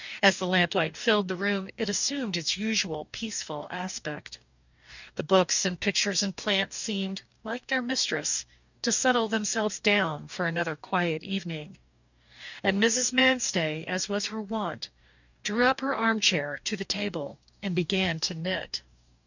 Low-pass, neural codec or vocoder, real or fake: 7.2 kHz; codec, 44.1 kHz, 2.6 kbps, DAC; fake